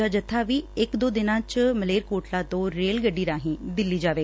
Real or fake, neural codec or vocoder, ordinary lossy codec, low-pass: real; none; none; none